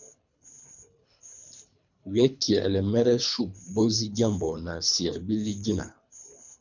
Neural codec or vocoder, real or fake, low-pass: codec, 24 kHz, 3 kbps, HILCodec; fake; 7.2 kHz